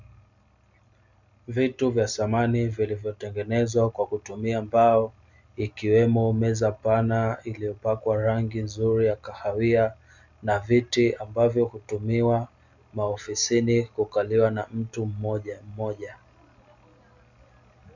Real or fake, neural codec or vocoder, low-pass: real; none; 7.2 kHz